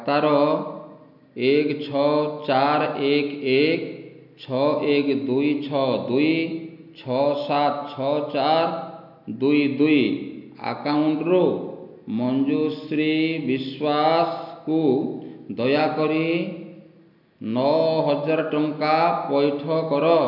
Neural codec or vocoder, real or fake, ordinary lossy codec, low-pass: none; real; none; 5.4 kHz